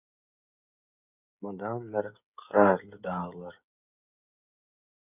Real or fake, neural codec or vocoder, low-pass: fake; codec, 16 kHz, 16 kbps, FreqCodec, smaller model; 3.6 kHz